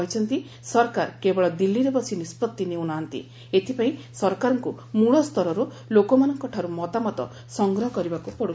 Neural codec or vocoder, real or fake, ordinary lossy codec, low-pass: none; real; none; none